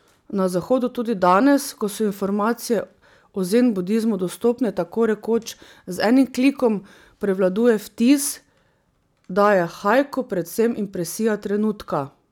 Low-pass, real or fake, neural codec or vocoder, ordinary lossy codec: 19.8 kHz; real; none; none